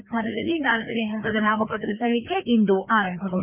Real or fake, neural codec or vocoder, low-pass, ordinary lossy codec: fake; codec, 16 kHz, 2 kbps, FreqCodec, larger model; 3.6 kHz; none